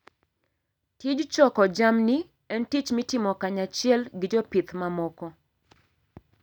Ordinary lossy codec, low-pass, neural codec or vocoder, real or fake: none; 19.8 kHz; none; real